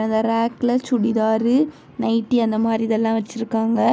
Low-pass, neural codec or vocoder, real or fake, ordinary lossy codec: none; none; real; none